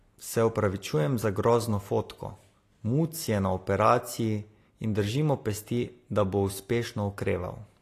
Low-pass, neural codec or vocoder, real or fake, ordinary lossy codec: 14.4 kHz; none; real; AAC, 48 kbps